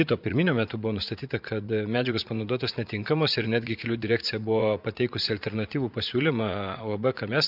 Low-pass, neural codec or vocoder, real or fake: 5.4 kHz; vocoder, 44.1 kHz, 80 mel bands, Vocos; fake